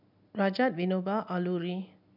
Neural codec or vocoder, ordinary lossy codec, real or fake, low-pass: none; none; real; 5.4 kHz